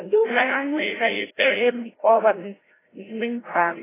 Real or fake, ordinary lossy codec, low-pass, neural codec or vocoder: fake; AAC, 16 kbps; 3.6 kHz; codec, 16 kHz, 0.5 kbps, FreqCodec, larger model